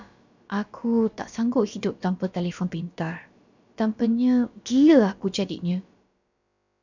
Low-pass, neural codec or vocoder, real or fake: 7.2 kHz; codec, 16 kHz, about 1 kbps, DyCAST, with the encoder's durations; fake